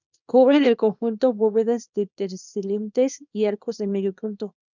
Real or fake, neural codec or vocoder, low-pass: fake; codec, 24 kHz, 0.9 kbps, WavTokenizer, small release; 7.2 kHz